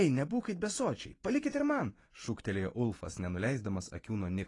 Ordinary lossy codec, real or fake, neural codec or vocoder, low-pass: AAC, 32 kbps; fake; vocoder, 24 kHz, 100 mel bands, Vocos; 10.8 kHz